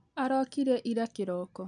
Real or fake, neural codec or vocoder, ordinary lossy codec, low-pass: real; none; none; 9.9 kHz